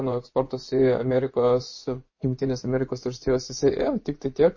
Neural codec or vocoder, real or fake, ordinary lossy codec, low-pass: vocoder, 24 kHz, 100 mel bands, Vocos; fake; MP3, 32 kbps; 7.2 kHz